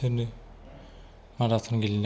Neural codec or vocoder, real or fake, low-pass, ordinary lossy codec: none; real; none; none